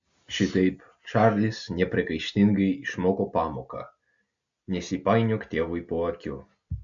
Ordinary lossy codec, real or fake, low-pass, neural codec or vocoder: AAC, 64 kbps; real; 7.2 kHz; none